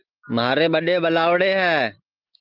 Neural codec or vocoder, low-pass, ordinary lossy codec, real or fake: none; 5.4 kHz; Opus, 24 kbps; real